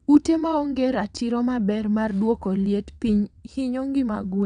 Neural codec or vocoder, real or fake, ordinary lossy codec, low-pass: vocoder, 22.05 kHz, 80 mel bands, WaveNeXt; fake; none; 9.9 kHz